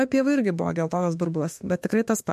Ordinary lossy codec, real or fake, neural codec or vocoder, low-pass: MP3, 64 kbps; fake; autoencoder, 48 kHz, 32 numbers a frame, DAC-VAE, trained on Japanese speech; 14.4 kHz